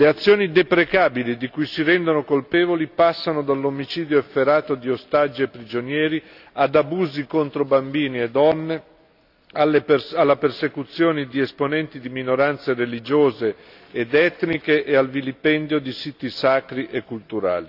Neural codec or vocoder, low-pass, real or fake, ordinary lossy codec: none; 5.4 kHz; real; AAC, 48 kbps